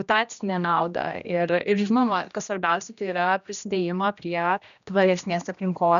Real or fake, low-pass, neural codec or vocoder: fake; 7.2 kHz; codec, 16 kHz, 1 kbps, X-Codec, HuBERT features, trained on general audio